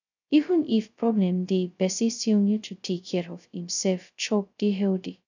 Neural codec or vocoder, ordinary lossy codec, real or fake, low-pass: codec, 16 kHz, 0.2 kbps, FocalCodec; none; fake; 7.2 kHz